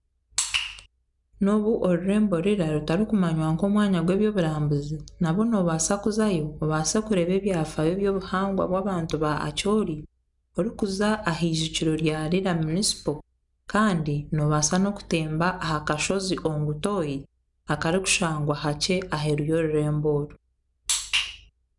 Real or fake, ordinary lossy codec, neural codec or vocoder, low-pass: real; none; none; 10.8 kHz